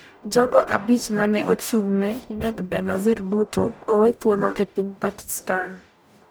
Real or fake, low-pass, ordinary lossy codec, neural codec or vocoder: fake; none; none; codec, 44.1 kHz, 0.9 kbps, DAC